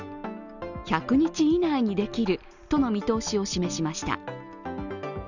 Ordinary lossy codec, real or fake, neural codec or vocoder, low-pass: none; real; none; 7.2 kHz